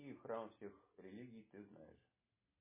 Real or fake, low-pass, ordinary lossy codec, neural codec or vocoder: real; 3.6 kHz; MP3, 16 kbps; none